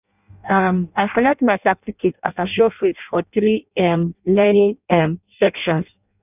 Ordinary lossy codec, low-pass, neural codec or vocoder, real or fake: none; 3.6 kHz; codec, 16 kHz in and 24 kHz out, 0.6 kbps, FireRedTTS-2 codec; fake